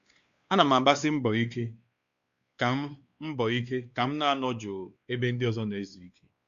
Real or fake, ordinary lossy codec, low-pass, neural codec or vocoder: fake; Opus, 64 kbps; 7.2 kHz; codec, 16 kHz, 2 kbps, X-Codec, WavLM features, trained on Multilingual LibriSpeech